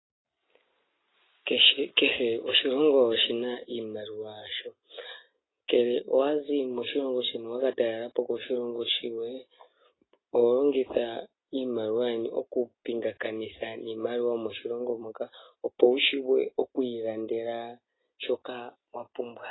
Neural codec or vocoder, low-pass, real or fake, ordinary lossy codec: none; 7.2 kHz; real; AAC, 16 kbps